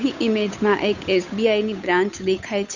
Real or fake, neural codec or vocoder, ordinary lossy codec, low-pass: fake; codec, 16 kHz, 8 kbps, FunCodec, trained on Chinese and English, 25 frames a second; none; 7.2 kHz